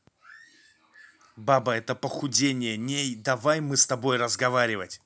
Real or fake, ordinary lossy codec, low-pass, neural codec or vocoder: real; none; none; none